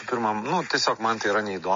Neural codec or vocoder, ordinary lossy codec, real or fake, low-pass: none; MP3, 32 kbps; real; 7.2 kHz